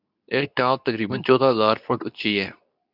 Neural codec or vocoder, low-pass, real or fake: codec, 24 kHz, 0.9 kbps, WavTokenizer, medium speech release version 2; 5.4 kHz; fake